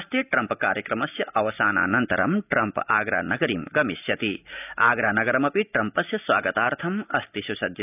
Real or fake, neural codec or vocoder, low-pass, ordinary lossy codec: real; none; 3.6 kHz; none